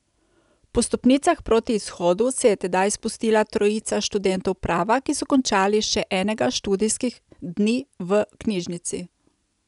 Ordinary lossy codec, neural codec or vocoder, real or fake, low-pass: none; none; real; 10.8 kHz